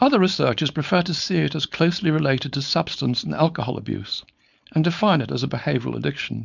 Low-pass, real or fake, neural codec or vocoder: 7.2 kHz; fake; codec, 16 kHz, 4.8 kbps, FACodec